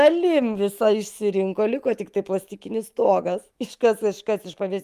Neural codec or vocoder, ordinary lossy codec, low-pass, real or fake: autoencoder, 48 kHz, 128 numbers a frame, DAC-VAE, trained on Japanese speech; Opus, 32 kbps; 14.4 kHz; fake